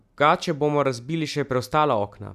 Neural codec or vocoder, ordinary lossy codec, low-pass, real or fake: none; none; 14.4 kHz; real